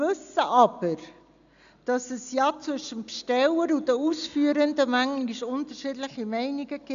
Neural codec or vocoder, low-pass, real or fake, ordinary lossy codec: none; 7.2 kHz; real; none